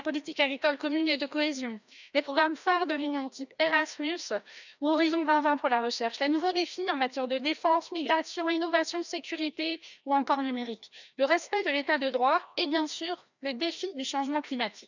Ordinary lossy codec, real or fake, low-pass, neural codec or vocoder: none; fake; 7.2 kHz; codec, 16 kHz, 1 kbps, FreqCodec, larger model